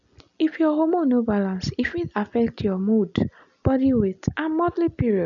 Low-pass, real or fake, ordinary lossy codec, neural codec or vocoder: 7.2 kHz; real; none; none